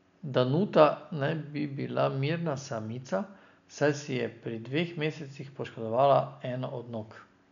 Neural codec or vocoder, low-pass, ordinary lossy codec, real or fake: none; 7.2 kHz; none; real